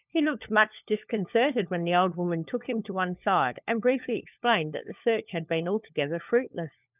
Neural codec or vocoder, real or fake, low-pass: codec, 16 kHz, 4 kbps, FunCodec, trained on LibriTTS, 50 frames a second; fake; 3.6 kHz